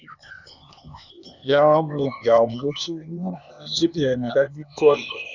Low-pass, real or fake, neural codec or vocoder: 7.2 kHz; fake; codec, 16 kHz, 0.8 kbps, ZipCodec